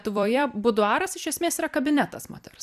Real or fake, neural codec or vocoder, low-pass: fake; vocoder, 44.1 kHz, 128 mel bands every 256 samples, BigVGAN v2; 14.4 kHz